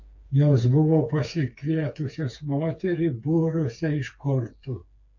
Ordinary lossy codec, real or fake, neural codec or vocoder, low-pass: MP3, 48 kbps; fake; codec, 16 kHz, 4 kbps, FreqCodec, smaller model; 7.2 kHz